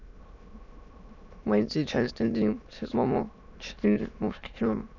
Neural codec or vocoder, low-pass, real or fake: autoencoder, 22.05 kHz, a latent of 192 numbers a frame, VITS, trained on many speakers; 7.2 kHz; fake